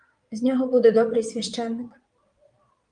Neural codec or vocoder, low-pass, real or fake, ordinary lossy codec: vocoder, 22.05 kHz, 80 mel bands, Vocos; 9.9 kHz; fake; Opus, 32 kbps